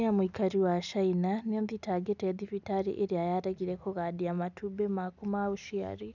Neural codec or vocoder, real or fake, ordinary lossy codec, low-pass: none; real; none; 7.2 kHz